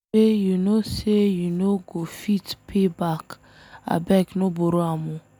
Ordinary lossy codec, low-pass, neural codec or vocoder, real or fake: none; none; none; real